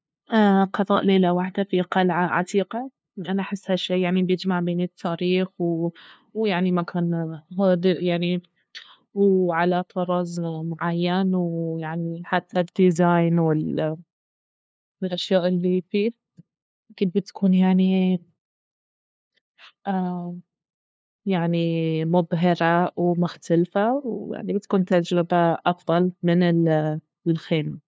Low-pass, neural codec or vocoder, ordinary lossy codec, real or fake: none; codec, 16 kHz, 2 kbps, FunCodec, trained on LibriTTS, 25 frames a second; none; fake